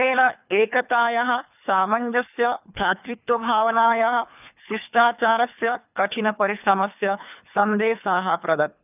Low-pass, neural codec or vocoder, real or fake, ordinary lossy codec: 3.6 kHz; codec, 24 kHz, 3 kbps, HILCodec; fake; none